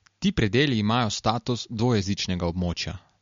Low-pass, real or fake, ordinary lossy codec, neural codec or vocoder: 7.2 kHz; real; MP3, 48 kbps; none